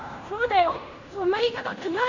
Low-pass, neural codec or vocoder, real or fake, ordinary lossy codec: 7.2 kHz; codec, 16 kHz in and 24 kHz out, 0.9 kbps, LongCat-Audio-Codec, fine tuned four codebook decoder; fake; none